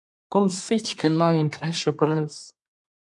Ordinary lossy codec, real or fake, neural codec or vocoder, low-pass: MP3, 96 kbps; fake; codec, 24 kHz, 1 kbps, SNAC; 10.8 kHz